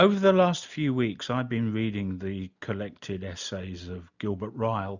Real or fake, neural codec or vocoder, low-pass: real; none; 7.2 kHz